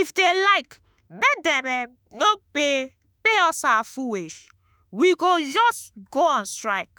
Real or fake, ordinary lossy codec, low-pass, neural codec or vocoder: fake; none; none; autoencoder, 48 kHz, 32 numbers a frame, DAC-VAE, trained on Japanese speech